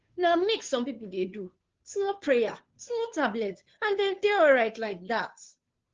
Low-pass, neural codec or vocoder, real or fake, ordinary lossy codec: 7.2 kHz; codec, 16 kHz, 2 kbps, FunCodec, trained on Chinese and English, 25 frames a second; fake; Opus, 16 kbps